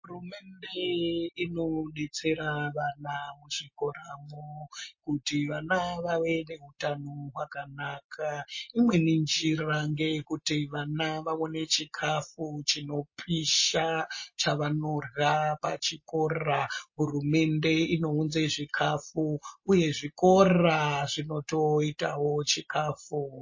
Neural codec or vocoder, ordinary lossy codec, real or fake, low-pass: none; MP3, 32 kbps; real; 7.2 kHz